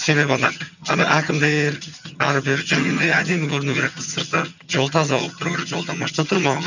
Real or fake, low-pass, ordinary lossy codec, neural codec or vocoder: fake; 7.2 kHz; none; vocoder, 22.05 kHz, 80 mel bands, HiFi-GAN